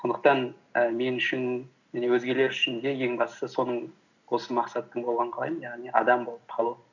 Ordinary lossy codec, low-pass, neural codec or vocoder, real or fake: none; 7.2 kHz; none; real